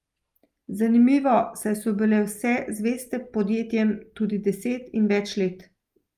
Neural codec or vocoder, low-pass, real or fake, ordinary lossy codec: none; 14.4 kHz; real; Opus, 32 kbps